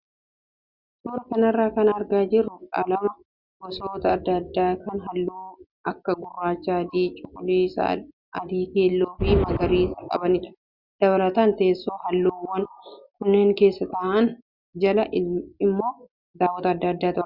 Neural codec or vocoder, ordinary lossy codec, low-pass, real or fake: none; Opus, 64 kbps; 5.4 kHz; real